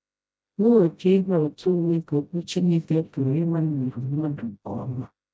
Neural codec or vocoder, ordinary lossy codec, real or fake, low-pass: codec, 16 kHz, 0.5 kbps, FreqCodec, smaller model; none; fake; none